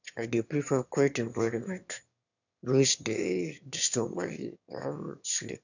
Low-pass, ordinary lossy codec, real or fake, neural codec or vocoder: 7.2 kHz; none; fake; autoencoder, 22.05 kHz, a latent of 192 numbers a frame, VITS, trained on one speaker